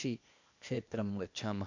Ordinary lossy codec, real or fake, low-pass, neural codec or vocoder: AAC, 48 kbps; fake; 7.2 kHz; codec, 16 kHz, 0.8 kbps, ZipCodec